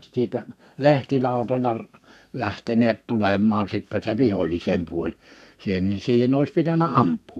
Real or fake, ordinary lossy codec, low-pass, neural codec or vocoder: fake; none; 14.4 kHz; codec, 32 kHz, 1.9 kbps, SNAC